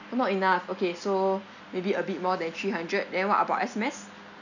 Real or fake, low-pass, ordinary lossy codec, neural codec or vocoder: real; 7.2 kHz; none; none